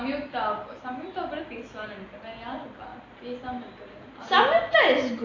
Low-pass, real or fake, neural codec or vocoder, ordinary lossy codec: 7.2 kHz; real; none; AAC, 32 kbps